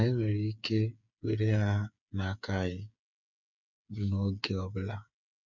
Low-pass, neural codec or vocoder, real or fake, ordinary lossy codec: 7.2 kHz; codec, 16 kHz, 8 kbps, FreqCodec, smaller model; fake; none